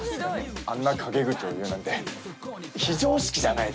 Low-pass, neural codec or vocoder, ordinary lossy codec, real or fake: none; none; none; real